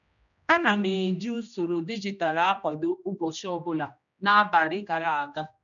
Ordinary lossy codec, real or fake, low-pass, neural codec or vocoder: none; fake; 7.2 kHz; codec, 16 kHz, 1 kbps, X-Codec, HuBERT features, trained on general audio